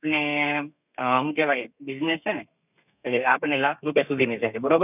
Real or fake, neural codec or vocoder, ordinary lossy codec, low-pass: fake; codec, 32 kHz, 1.9 kbps, SNAC; none; 3.6 kHz